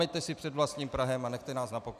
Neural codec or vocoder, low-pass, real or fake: none; 14.4 kHz; real